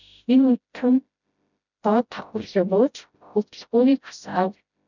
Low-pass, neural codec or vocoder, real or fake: 7.2 kHz; codec, 16 kHz, 0.5 kbps, FreqCodec, smaller model; fake